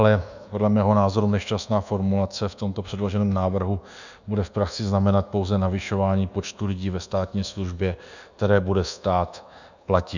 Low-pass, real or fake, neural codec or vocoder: 7.2 kHz; fake; codec, 24 kHz, 1.2 kbps, DualCodec